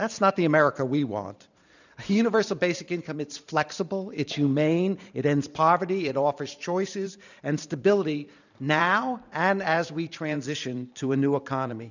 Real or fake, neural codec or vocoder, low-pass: fake; vocoder, 44.1 kHz, 128 mel bands every 512 samples, BigVGAN v2; 7.2 kHz